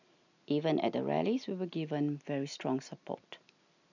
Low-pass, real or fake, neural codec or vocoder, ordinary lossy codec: 7.2 kHz; real; none; none